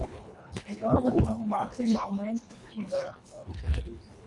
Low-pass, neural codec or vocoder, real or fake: 10.8 kHz; codec, 24 kHz, 1.5 kbps, HILCodec; fake